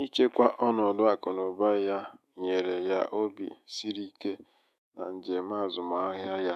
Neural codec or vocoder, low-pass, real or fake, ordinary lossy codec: autoencoder, 48 kHz, 128 numbers a frame, DAC-VAE, trained on Japanese speech; 14.4 kHz; fake; none